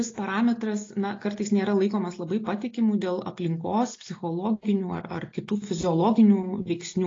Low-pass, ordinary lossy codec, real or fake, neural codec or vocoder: 7.2 kHz; AAC, 32 kbps; real; none